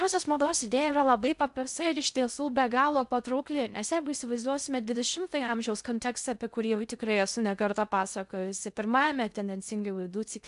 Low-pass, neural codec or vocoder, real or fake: 10.8 kHz; codec, 16 kHz in and 24 kHz out, 0.6 kbps, FocalCodec, streaming, 2048 codes; fake